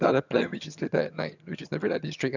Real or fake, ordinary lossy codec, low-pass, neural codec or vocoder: fake; none; 7.2 kHz; vocoder, 22.05 kHz, 80 mel bands, HiFi-GAN